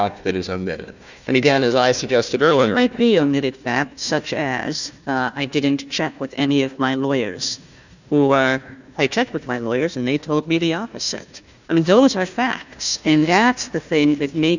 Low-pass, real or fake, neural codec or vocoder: 7.2 kHz; fake; codec, 16 kHz, 1 kbps, FunCodec, trained on Chinese and English, 50 frames a second